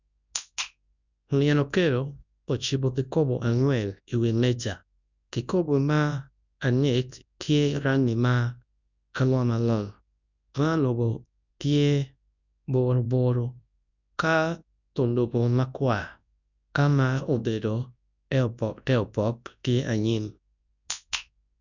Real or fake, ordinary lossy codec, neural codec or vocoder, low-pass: fake; none; codec, 24 kHz, 0.9 kbps, WavTokenizer, large speech release; 7.2 kHz